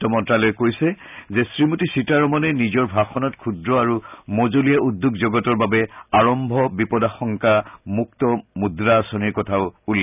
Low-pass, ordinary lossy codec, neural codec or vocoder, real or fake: 3.6 kHz; none; none; real